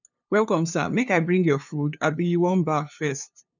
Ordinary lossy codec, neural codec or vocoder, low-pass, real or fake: none; codec, 16 kHz, 2 kbps, FunCodec, trained on LibriTTS, 25 frames a second; 7.2 kHz; fake